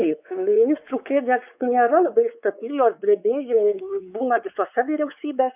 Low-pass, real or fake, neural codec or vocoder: 3.6 kHz; fake; codec, 16 kHz, 4 kbps, X-Codec, WavLM features, trained on Multilingual LibriSpeech